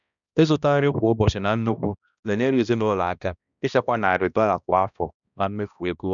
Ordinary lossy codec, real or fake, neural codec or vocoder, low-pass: none; fake; codec, 16 kHz, 1 kbps, X-Codec, HuBERT features, trained on balanced general audio; 7.2 kHz